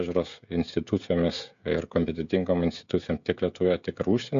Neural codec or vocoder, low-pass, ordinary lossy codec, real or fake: codec, 16 kHz, 16 kbps, FreqCodec, smaller model; 7.2 kHz; MP3, 64 kbps; fake